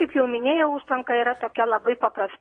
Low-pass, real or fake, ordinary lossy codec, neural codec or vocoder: 9.9 kHz; fake; AAC, 32 kbps; vocoder, 22.05 kHz, 80 mel bands, Vocos